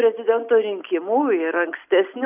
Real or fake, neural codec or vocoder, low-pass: real; none; 3.6 kHz